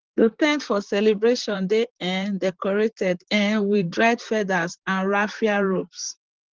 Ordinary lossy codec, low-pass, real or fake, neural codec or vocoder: Opus, 16 kbps; 7.2 kHz; fake; vocoder, 44.1 kHz, 128 mel bands, Pupu-Vocoder